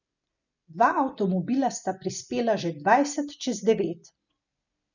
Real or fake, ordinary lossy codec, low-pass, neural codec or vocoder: real; none; 7.2 kHz; none